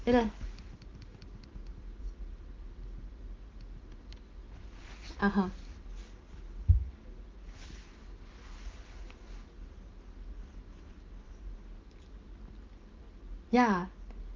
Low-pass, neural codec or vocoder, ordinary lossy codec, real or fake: 7.2 kHz; none; Opus, 32 kbps; real